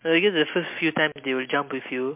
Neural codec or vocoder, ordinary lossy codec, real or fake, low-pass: none; MP3, 32 kbps; real; 3.6 kHz